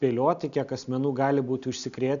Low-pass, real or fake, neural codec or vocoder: 7.2 kHz; real; none